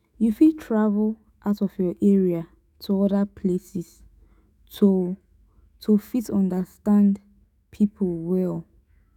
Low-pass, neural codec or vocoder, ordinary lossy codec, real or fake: 19.8 kHz; autoencoder, 48 kHz, 128 numbers a frame, DAC-VAE, trained on Japanese speech; none; fake